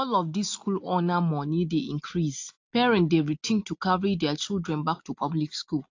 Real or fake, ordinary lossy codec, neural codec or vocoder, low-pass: real; AAC, 48 kbps; none; 7.2 kHz